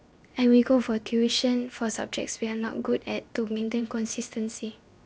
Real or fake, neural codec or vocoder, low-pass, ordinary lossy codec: fake; codec, 16 kHz, 0.7 kbps, FocalCodec; none; none